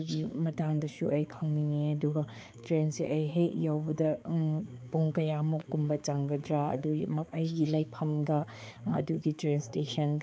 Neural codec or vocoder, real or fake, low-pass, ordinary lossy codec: codec, 16 kHz, 4 kbps, X-Codec, HuBERT features, trained on balanced general audio; fake; none; none